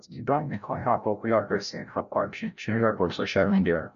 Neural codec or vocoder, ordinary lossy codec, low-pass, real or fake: codec, 16 kHz, 0.5 kbps, FreqCodec, larger model; none; 7.2 kHz; fake